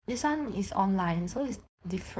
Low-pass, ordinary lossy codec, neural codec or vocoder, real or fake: none; none; codec, 16 kHz, 4.8 kbps, FACodec; fake